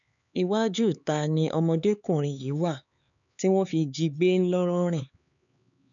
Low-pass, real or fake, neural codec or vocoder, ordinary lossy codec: 7.2 kHz; fake; codec, 16 kHz, 4 kbps, X-Codec, HuBERT features, trained on LibriSpeech; none